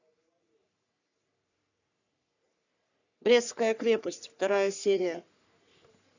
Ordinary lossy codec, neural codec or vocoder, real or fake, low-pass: none; codec, 44.1 kHz, 3.4 kbps, Pupu-Codec; fake; 7.2 kHz